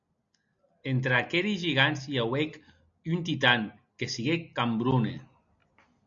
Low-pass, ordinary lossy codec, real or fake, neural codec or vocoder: 7.2 kHz; MP3, 64 kbps; real; none